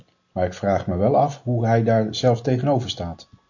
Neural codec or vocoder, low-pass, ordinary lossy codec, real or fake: none; 7.2 kHz; AAC, 48 kbps; real